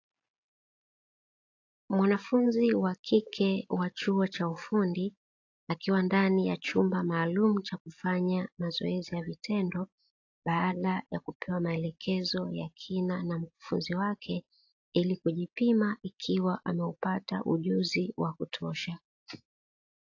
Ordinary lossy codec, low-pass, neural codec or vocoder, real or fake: AAC, 48 kbps; 7.2 kHz; none; real